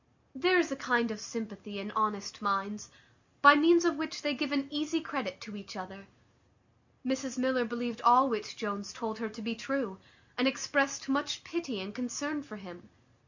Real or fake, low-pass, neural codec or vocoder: real; 7.2 kHz; none